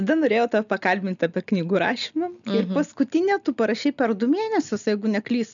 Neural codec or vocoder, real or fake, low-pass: none; real; 7.2 kHz